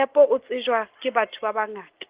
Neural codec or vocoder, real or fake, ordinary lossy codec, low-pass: none; real; Opus, 16 kbps; 3.6 kHz